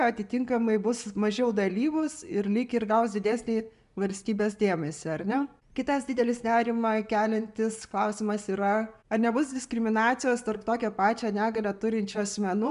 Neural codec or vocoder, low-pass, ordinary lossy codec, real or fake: none; 10.8 kHz; MP3, 96 kbps; real